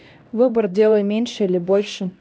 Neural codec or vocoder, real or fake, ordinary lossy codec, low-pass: codec, 16 kHz, 1 kbps, X-Codec, HuBERT features, trained on LibriSpeech; fake; none; none